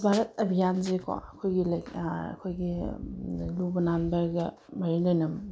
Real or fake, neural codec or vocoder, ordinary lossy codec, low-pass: real; none; none; none